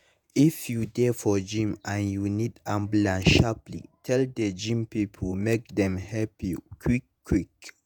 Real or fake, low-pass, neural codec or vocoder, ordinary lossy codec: fake; none; vocoder, 48 kHz, 128 mel bands, Vocos; none